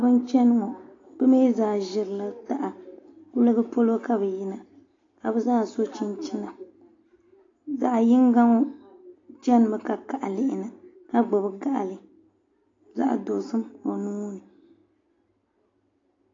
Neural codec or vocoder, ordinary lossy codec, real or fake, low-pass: none; AAC, 32 kbps; real; 7.2 kHz